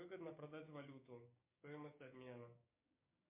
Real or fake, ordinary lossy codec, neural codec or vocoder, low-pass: fake; MP3, 32 kbps; codec, 16 kHz, 6 kbps, DAC; 3.6 kHz